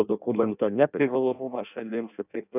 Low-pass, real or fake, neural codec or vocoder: 3.6 kHz; fake; codec, 16 kHz in and 24 kHz out, 0.6 kbps, FireRedTTS-2 codec